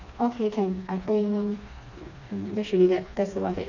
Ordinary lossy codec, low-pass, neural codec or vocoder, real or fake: none; 7.2 kHz; codec, 16 kHz, 2 kbps, FreqCodec, smaller model; fake